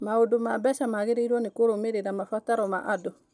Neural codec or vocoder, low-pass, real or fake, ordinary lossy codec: none; 9.9 kHz; real; none